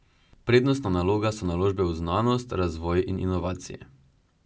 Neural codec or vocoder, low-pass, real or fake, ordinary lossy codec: none; none; real; none